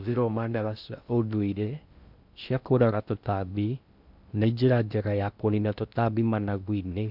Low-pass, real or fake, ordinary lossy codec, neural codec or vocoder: 5.4 kHz; fake; none; codec, 16 kHz in and 24 kHz out, 0.6 kbps, FocalCodec, streaming, 2048 codes